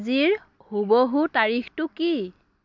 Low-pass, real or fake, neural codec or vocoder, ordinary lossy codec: 7.2 kHz; real; none; MP3, 64 kbps